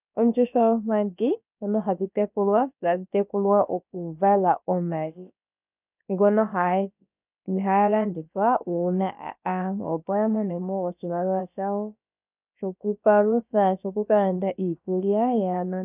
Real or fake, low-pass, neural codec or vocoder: fake; 3.6 kHz; codec, 16 kHz, about 1 kbps, DyCAST, with the encoder's durations